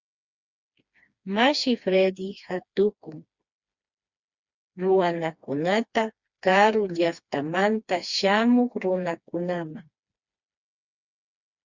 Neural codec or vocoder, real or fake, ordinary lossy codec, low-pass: codec, 16 kHz, 2 kbps, FreqCodec, smaller model; fake; Opus, 64 kbps; 7.2 kHz